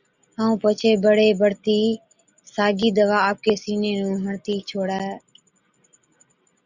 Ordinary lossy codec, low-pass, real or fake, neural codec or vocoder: Opus, 64 kbps; 7.2 kHz; real; none